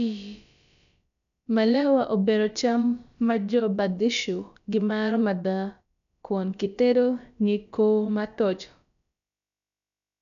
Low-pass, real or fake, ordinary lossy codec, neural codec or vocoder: 7.2 kHz; fake; none; codec, 16 kHz, about 1 kbps, DyCAST, with the encoder's durations